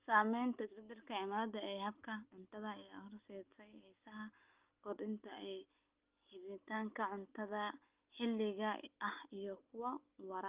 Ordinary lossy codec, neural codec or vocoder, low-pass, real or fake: Opus, 24 kbps; none; 3.6 kHz; real